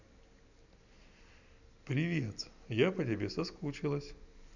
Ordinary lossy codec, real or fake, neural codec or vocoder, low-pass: none; real; none; 7.2 kHz